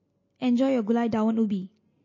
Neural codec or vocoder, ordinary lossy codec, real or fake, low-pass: none; MP3, 32 kbps; real; 7.2 kHz